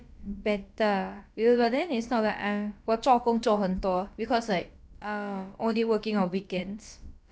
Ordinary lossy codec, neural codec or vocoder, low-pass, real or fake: none; codec, 16 kHz, about 1 kbps, DyCAST, with the encoder's durations; none; fake